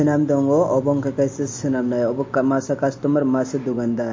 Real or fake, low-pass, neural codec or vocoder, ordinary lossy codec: real; 7.2 kHz; none; MP3, 32 kbps